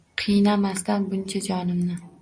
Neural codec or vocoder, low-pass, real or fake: none; 9.9 kHz; real